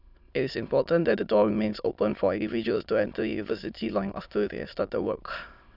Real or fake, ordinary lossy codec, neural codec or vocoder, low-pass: fake; none; autoencoder, 22.05 kHz, a latent of 192 numbers a frame, VITS, trained on many speakers; 5.4 kHz